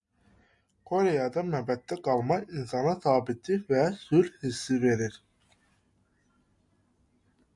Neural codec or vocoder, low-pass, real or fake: none; 10.8 kHz; real